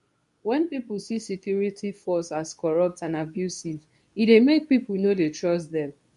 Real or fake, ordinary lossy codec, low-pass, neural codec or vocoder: fake; none; 10.8 kHz; codec, 24 kHz, 0.9 kbps, WavTokenizer, medium speech release version 2